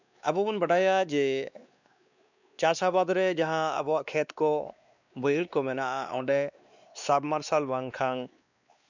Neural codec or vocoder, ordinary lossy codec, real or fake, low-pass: codec, 16 kHz, 2 kbps, X-Codec, WavLM features, trained on Multilingual LibriSpeech; none; fake; 7.2 kHz